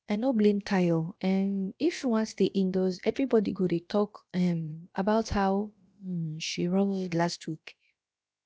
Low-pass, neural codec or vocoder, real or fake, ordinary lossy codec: none; codec, 16 kHz, about 1 kbps, DyCAST, with the encoder's durations; fake; none